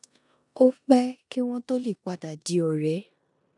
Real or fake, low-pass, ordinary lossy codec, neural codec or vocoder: fake; 10.8 kHz; none; codec, 16 kHz in and 24 kHz out, 0.9 kbps, LongCat-Audio-Codec, four codebook decoder